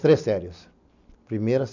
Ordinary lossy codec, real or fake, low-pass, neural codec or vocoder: none; real; 7.2 kHz; none